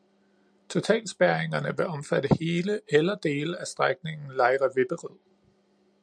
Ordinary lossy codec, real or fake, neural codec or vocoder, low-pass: MP3, 96 kbps; real; none; 9.9 kHz